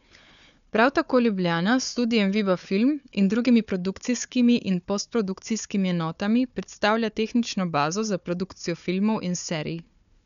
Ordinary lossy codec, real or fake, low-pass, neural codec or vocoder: none; fake; 7.2 kHz; codec, 16 kHz, 4 kbps, FunCodec, trained on Chinese and English, 50 frames a second